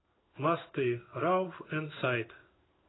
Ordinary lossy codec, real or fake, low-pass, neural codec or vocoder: AAC, 16 kbps; fake; 7.2 kHz; codec, 16 kHz in and 24 kHz out, 1 kbps, XY-Tokenizer